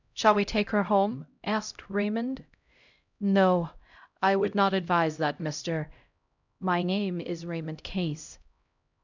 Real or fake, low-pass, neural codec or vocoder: fake; 7.2 kHz; codec, 16 kHz, 0.5 kbps, X-Codec, HuBERT features, trained on LibriSpeech